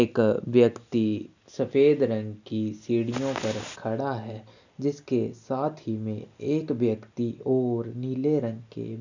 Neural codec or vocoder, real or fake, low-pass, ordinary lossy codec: none; real; 7.2 kHz; none